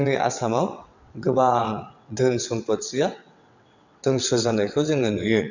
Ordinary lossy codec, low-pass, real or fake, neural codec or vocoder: none; 7.2 kHz; fake; vocoder, 22.05 kHz, 80 mel bands, WaveNeXt